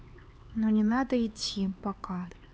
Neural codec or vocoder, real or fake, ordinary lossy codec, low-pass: codec, 16 kHz, 4 kbps, X-Codec, HuBERT features, trained on LibriSpeech; fake; none; none